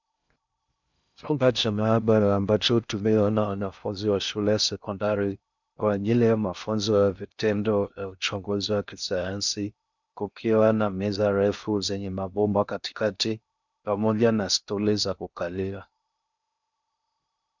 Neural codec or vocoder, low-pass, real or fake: codec, 16 kHz in and 24 kHz out, 0.6 kbps, FocalCodec, streaming, 2048 codes; 7.2 kHz; fake